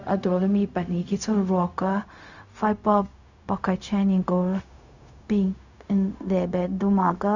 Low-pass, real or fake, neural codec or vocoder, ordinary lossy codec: 7.2 kHz; fake; codec, 16 kHz, 0.4 kbps, LongCat-Audio-Codec; none